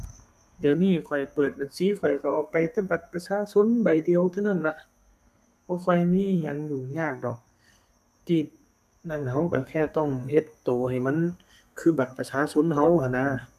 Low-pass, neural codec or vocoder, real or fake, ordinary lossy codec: 14.4 kHz; codec, 32 kHz, 1.9 kbps, SNAC; fake; none